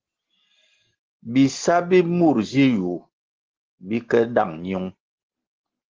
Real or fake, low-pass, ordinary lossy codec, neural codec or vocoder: real; 7.2 kHz; Opus, 16 kbps; none